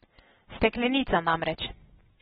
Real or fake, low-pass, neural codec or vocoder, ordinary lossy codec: real; 19.8 kHz; none; AAC, 16 kbps